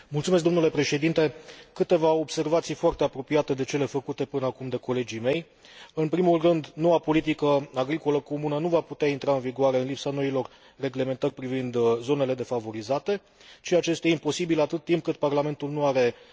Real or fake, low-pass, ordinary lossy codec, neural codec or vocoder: real; none; none; none